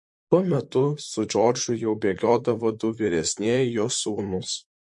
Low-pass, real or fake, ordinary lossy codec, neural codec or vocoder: 10.8 kHz; fake; MP3, 48 kbps; vocoder, 44.1 kHz, 128 mel bands, Pupu-Vocoder